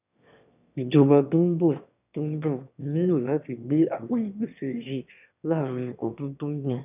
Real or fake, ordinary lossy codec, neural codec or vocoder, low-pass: fake; none; autoencoder, 22.05 kHz, a latent of 192 numbers a frame, VITS, trained on one speaker; 3.6 kHz